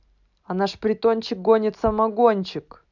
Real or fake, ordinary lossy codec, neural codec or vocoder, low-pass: real; none; none; 7.2 kHz